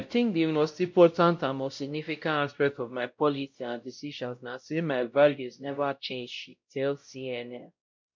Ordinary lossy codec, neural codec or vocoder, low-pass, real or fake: MP3, 64 kbps; codec, 16 kHz, 0.5 kbps, X-Codec, WavLM features, trained on Multilingual LibriSpeech; 7.2 kHz; fake